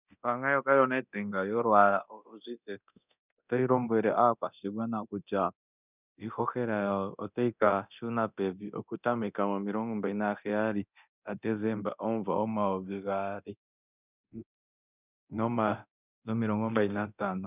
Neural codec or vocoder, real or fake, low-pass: codec, 24 kHz, 0.9 kbps, DualCodec; fake; 3.6 kHz